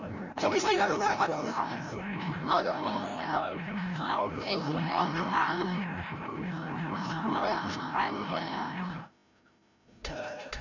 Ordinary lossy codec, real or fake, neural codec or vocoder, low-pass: none; fake; codec, 16 kHz, 0.5 kbps, FreqCodec, larger model; 7.2 kHz